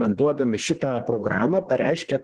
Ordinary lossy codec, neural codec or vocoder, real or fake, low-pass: Opus, 16 kbps; codec, 24 kHz, 1 kbps, SNAC; fake; 10.8 kHz